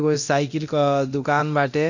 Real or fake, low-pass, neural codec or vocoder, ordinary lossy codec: fake; 7.2 kHz; codec, 24 kHz, 0.9 kbps, DualCodec; none